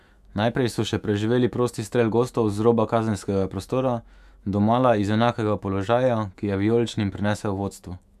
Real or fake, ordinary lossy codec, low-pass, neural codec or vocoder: fake; none; 14.4 kHz; autoencoder, 48 kHz, 128 numbers a frame, DAC-VAE, trained on Japanese speech